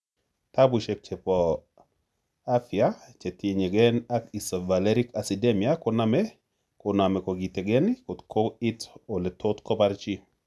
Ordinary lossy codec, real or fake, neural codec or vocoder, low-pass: none; real; none; none